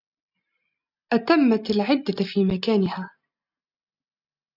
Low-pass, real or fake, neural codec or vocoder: 5.4 kHz; real; none